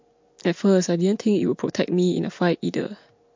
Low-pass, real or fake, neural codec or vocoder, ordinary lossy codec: 7.2 kHz; real; none; MP3, 48 kbps